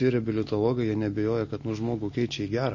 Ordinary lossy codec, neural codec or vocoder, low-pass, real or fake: MP3, 32 kbps; none; 7.2 kHz; real